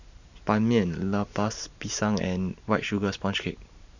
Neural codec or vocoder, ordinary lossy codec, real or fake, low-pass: vocoder, 44.1 kHz, 128 mel bands every 512 samples, BigVGAN v2; none; fake; 7.2 kHz